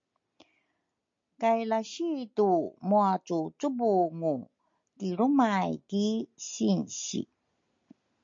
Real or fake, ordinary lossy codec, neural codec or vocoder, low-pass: real; MP3, 48 kbps; none; 7.2 kHz